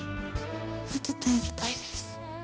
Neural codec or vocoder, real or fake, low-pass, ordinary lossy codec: codec, 16 kHz, 1 kbps, X-Codec, HuBERT features, trained on balanced general audio; fake; none; none